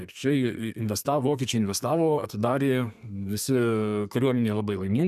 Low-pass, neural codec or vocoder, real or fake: 14.4 kHz; codec, 32 kHz, 1.9 kbps, SNAC; fake